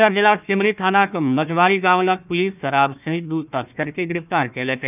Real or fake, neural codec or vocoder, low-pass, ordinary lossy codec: fake; codec, 16 kHz, 1 kbps, FunCodec, trained on Chinese and English, 50 frames a second; 3.6 kHz; none